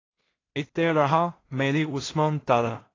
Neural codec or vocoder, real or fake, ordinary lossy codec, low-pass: codec, 16 kHz in and 24 kHz out, 0.4 kbps, LongCat-Audio-Codec, two codebook decoder; fake; AAC, 32 kbps; 7.2 kHz